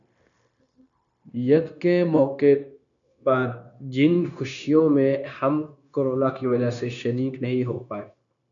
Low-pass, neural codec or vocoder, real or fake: 7.2 kHz; codec, 16 kHz, 0.9 kbps, LongCat-Audio-Codec; fake